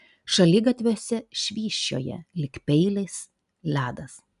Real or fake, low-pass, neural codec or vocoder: real; 10.8 kHz; none